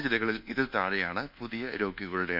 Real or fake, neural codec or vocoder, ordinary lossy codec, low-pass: fake; codec, 24 kHz, 1.2 kbps, DualCodec; none; 5.4 kHz